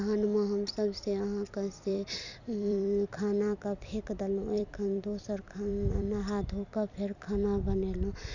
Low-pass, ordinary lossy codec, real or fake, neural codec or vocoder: 7.2 kHz; none; fake; vocoder, 44.1 kHz, 128 mel bands every 256 samples, BigVGAN v2